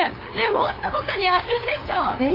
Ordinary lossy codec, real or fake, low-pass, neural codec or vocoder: AAC, 24 kbps; fake; 5.4 kHz; codec, 16 kHz, 2 kbps, FunCodec, trained on LibriTTS, 25 frames a second